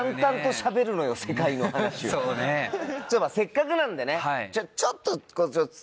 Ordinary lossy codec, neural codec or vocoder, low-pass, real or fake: none; none; none; real